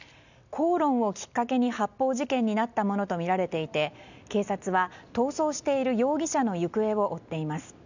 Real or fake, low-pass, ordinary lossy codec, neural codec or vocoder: real; 7.2 kHz; none; none